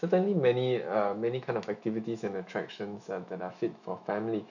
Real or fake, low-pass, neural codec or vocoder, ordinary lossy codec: fake; 7.2 kHz; autoencoder, 48 kHz, 128 numbers a frame, DAC-VAE, trained on Japanese speech; none